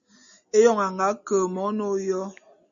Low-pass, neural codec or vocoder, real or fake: 7.2 kHz; none; real